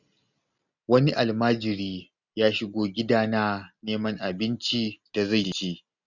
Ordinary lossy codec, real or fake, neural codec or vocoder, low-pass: none; real; none; 7.2 kHz